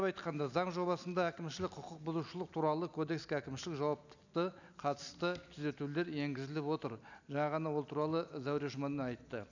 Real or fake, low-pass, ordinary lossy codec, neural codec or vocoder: real; 7.2 kHz; none; none